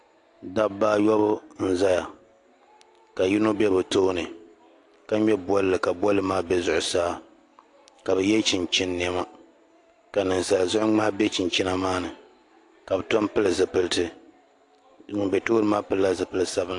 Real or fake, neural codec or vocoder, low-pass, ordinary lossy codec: real; none; 10.8 kHz; AAC, 48 kbps